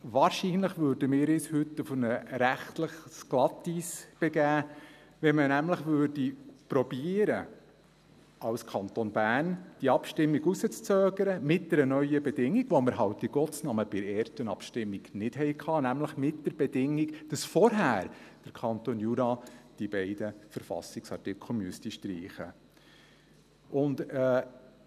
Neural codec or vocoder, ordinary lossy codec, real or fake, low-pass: none; none; real; 14.4 kHz